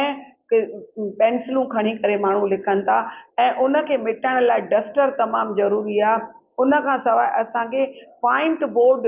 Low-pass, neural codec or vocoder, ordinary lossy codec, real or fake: 3.6 kHz; none; Opus, 24 kbps; real